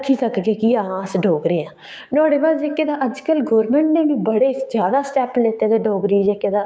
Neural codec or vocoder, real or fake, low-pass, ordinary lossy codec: codec, 16 kHz, 6 kbps, DAC; fake; none; none